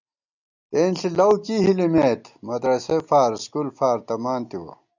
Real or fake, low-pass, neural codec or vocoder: real; 7.2 kHz; none